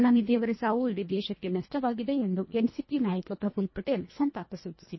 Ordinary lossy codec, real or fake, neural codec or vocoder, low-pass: MP3, 24 kbps; fake; codec, 24 kHz, 1.5 kbps, HILCodec; 7.2 kHz